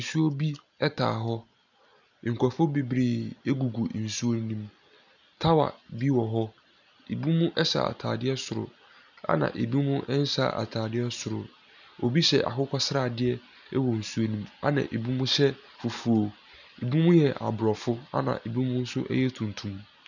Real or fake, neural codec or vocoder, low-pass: real; none; 7.2 kHz